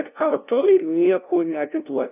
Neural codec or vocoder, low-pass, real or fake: codec, 16 kHz, 0.5 kbps, FunCodec, trained on LibriTTS, 25 frames a second; 3.6 kHz; fake